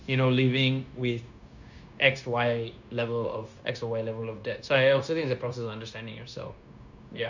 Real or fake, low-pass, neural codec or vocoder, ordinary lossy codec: fake; 7.2 kHz; codec, 16 kHz, 0.9 kbps, LongCat-Audio-Codec; none